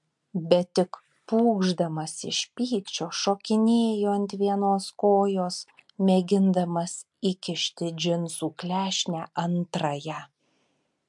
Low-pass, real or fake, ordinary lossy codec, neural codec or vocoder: 10.8 kHz; real; MP3, 64 kbps; none